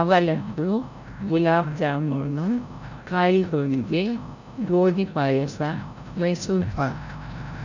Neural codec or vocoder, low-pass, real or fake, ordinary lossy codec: codec, 16 kHz, 0.5 kbps, FreqCodec, larger model; 7.2 kHz; fake; none